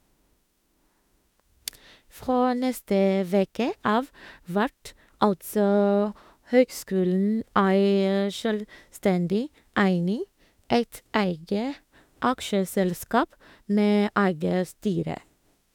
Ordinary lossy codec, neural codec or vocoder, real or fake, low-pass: none; autoencoder, 48 kHz, 32 numbers a frame, DAC-VAE, trained on Japanese speech; fake; 19.8 kHz